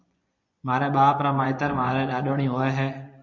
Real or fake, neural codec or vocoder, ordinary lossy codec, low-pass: fake; vocoder, 24 kHz, 100 mel bands, Vocos; MP3, 64 kbps; 7.2 kHz